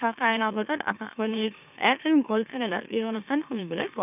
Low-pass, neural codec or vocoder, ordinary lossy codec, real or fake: 3.6 kHz; autoencoder, 44.1 kHz, a latent of 192 numbers a frame, MeloTTS; none; fake